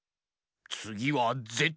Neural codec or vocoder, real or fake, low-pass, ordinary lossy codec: none; real; none; none